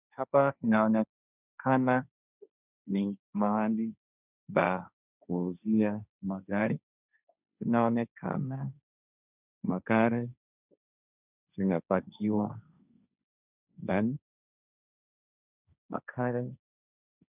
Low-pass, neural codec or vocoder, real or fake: 3.6 kHz; codec, 16 kHz, 1.1 kbps, Voila-Tokenizer; fake